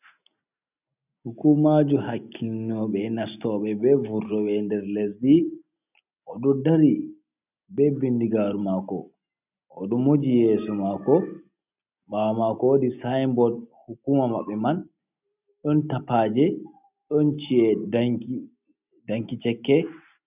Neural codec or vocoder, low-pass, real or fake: none; 3.6 kHz; real